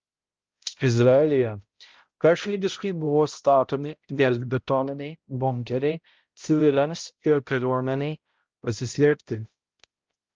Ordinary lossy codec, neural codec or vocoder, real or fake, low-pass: Opus, 24 kbps; codec, 16 kHz, 0.5 kbps, X-Codec, HuBERT features, trained on balanced general audio; fake; 7.2 kHz